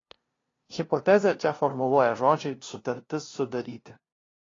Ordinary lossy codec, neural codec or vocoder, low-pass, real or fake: AAC, 32 kbps; codec, 16 kHz, 0.5 kbps, FunCodec, trained on LibriTTS, 25 frames a second; 7.2 kHz; fake